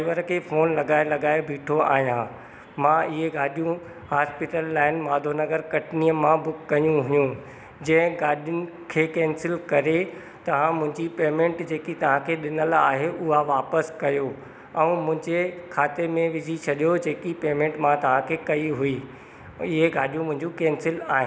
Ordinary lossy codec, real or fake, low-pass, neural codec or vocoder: none; real; none; none